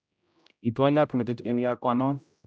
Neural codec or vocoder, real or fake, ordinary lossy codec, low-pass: codec, 16 kHz, 0.5 kbps, X-Codec, HuBERT features, trained on general audio; fake; none; none